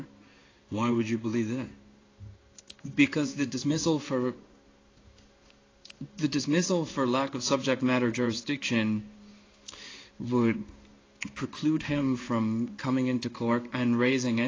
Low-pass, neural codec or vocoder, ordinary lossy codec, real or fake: 7.2 kHz; codec, 16 kHz in and 24 kHz out, 1 kbps, XY-Tokenizer; AAC, 32 kbps; fake